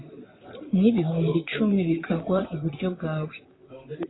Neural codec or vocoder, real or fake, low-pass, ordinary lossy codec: vocoder, 44.1 kHz, 128 mel bands, Pupu-Vocoder; fake; 7.2 kHz; AAC, 16 kbps